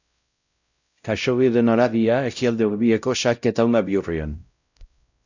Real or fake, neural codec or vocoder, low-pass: fake; codec, 16 kHz, 0.5 kbps, X-Codec, WavLM features, trained on Multilingual LibriSpeech; 7.2 kHz